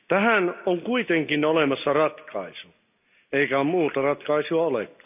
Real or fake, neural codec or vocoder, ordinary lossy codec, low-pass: real; none; none; 3.6 kHz